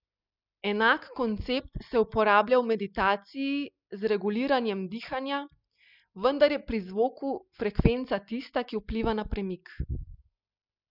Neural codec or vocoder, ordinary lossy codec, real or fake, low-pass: none; none; real; 5.4 kHz